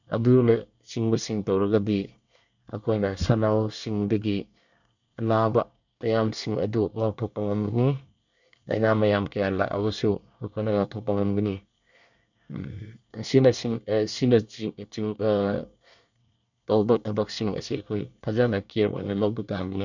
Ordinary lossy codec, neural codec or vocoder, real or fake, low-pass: none; codec, 24 kHz, 1 kbps, SNAC; fake; 7.2 kHz